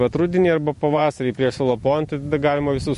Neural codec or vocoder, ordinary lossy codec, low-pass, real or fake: vocoder, 44.1 kHz, 128 mel bands every 512 samples, BigVGAN v2; MP3, 48 kbps; 14.4 kHz; fake